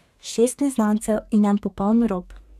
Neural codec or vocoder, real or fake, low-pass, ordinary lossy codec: codec, 32 kHz, 1.9 kbps, SNAC; fake; 14.4 kHz; none